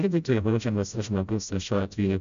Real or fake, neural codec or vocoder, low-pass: fake; codec, 16 kHz, 0.5 kbps, FreqCodec, smaller model; 7.2 kHz